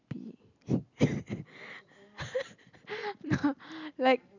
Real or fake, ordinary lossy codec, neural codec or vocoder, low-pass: real; none; none; 7.2 kHz